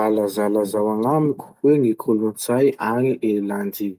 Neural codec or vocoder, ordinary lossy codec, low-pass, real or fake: none; Opus, 32 kbps; 14.4 kHz; real